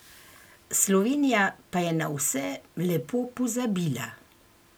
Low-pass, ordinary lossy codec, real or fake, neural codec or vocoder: none; none; real; none